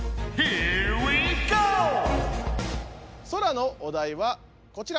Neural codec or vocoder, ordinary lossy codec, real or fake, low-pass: none; none; real; none